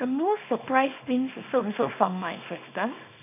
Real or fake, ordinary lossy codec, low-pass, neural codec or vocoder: fake; none; 3.6 kHz; codec, 24 kHz, 0.9 kbps, WavTokenizer, small release